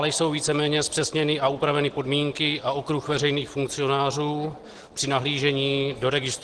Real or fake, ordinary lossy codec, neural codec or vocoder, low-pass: real; Opus, 16 kbps; none; 10.8 kHz